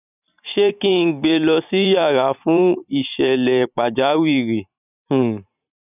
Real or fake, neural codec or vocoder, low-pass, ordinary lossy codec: fake; vocoder, 44.1 kHz, 128 mel bands every 512 samples, BigVGAN v2; 3.6 kHz; none